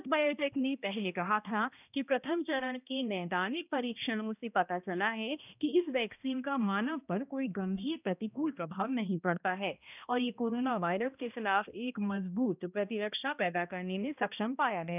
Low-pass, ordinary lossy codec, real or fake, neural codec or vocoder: 3.6 kHz; none; fake; codec, 16 kHz, 1 kbps, X-Codec, HuBERT features, trained on balanced general audio